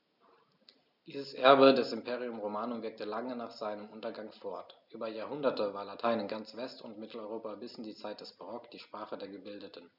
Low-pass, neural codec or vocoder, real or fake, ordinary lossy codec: 5.4 kHz; none; real; none